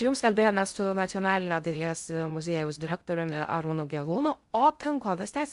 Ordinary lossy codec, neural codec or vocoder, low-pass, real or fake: Opus, 64 kbps; codec, 16 kHz in and 24 kHz out, 0.6 kbps, FocalCodec, streaming, 2048 codes; 10.8 kHz; fake